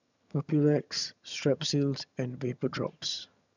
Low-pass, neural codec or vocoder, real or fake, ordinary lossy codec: 7.2 kHz; vocoder, 22.05 kHz, 80 mel bands, HiFi-GAN; fake; none